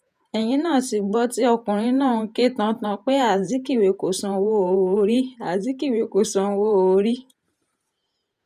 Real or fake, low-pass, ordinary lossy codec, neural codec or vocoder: fake; 14.4 kHz; none; vocoder, 48 kHz, 128 mel bands, Vocos